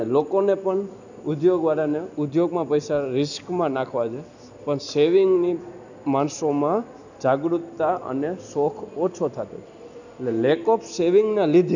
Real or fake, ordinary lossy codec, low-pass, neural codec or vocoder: real; none; 7.2 kHz; none